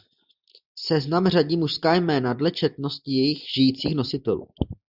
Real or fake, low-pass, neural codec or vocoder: real; 5.4 kHz; none